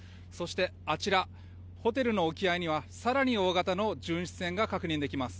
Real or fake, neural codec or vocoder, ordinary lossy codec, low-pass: real; none; none; none